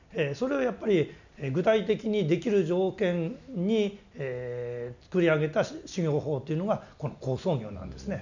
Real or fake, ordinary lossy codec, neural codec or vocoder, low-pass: real; none; none; 7.2 kHz